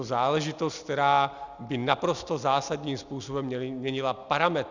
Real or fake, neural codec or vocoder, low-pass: real; none; 7.2 kHz